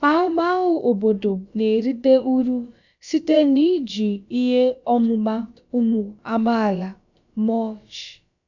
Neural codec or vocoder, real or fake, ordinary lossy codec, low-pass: codec, 16 kHz, about 1 kbps, DyCAST, with the encoder's durations; fake; none; 7.2 kHz